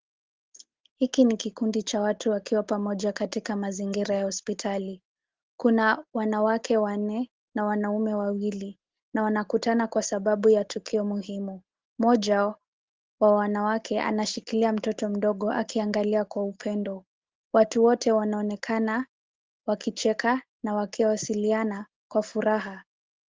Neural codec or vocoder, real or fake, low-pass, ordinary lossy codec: none; real; 7.2 kHz; Opus, 16 kbps